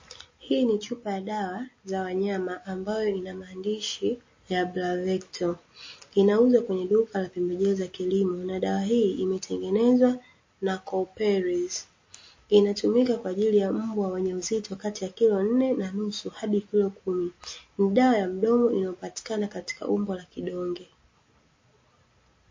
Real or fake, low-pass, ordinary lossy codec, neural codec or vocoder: real; 7.2 kHz; MP3, 32 kbps; none